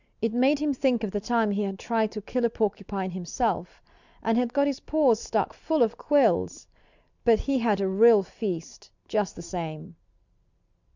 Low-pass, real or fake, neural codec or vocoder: 7.2 kHz; real; none